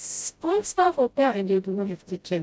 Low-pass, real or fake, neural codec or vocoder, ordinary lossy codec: none; fake; codec, 16 kHz, 0.5 kbps, FreqCodec, smaller model; none